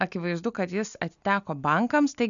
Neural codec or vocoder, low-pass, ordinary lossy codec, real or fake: none; 7.2 kHz; MP3, 96 kbps; real